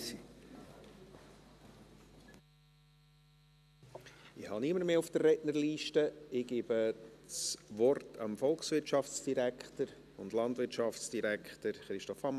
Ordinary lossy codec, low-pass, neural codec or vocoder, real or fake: none; 14.4 kHz; none; real